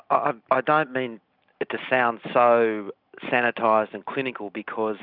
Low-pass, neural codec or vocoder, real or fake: 5.4 kHz; none; real